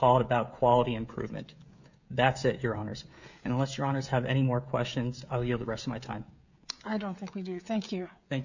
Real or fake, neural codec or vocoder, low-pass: fake; codec, 16 kHz, 16 kbps, FreqCodec, smaller model; 7.2 kHz